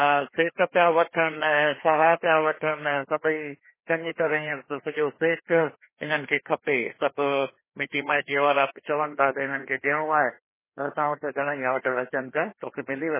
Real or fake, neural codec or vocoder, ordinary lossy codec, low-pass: fake; codec, 16 kHz, 2 kbps, FreqCodec, larger model; MP3, 16 kbps; 3.6 kHz